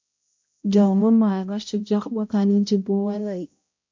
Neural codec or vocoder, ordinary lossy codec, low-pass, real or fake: codec, 16 kHz, 0.5 kbps, X-Codec, HuBERT features, trained on balanced general audio; MP3, 64 kbps; 7.2 kHz; fake